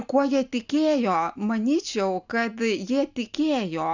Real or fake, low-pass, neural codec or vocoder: real; 7.2 kHz; none